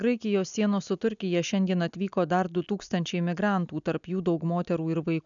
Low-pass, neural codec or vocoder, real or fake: 7.2 kHz; none; real